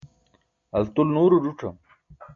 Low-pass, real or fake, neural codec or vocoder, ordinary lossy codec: 7.2 kHz; real; none; MP3, 48 kbps